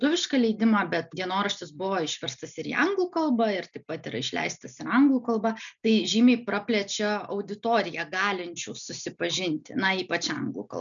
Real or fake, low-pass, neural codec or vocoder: real; 7.2 kHz; none